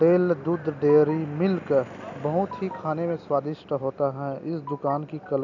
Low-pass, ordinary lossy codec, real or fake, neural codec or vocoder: 7.2 kHz; none; real; none